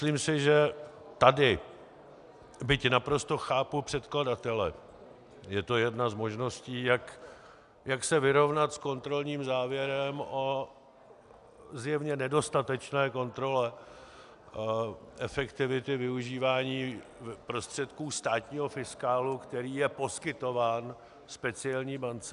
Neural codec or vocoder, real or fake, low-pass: none; real; 10.8 kHz